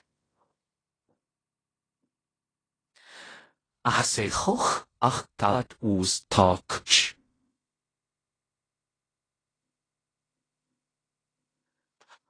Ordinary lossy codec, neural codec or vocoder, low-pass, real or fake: AAC, 32 kbps; codec, 16 kHz in and 24 kHz out, 0.4 kbps, LongCat-Audio-Codec, fine tuned four codebook decoder; 9.9 kHz; fake